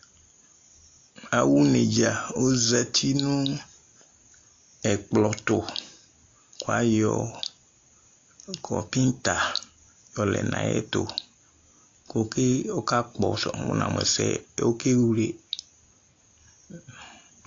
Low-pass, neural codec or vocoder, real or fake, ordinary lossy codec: 7.2 kHz; none; real; AAC, 48 kbps